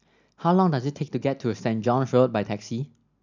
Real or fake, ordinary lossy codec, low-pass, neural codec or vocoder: real; none; 7.2 kHz; none